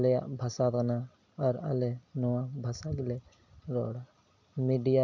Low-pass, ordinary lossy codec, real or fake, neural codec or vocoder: 7.2 kHz; none; real; none